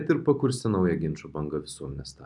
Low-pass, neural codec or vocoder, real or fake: 10.8 kHz; none; real